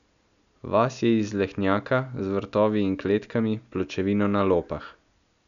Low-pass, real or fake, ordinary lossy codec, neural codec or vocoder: 7.2 kHz; real; none; none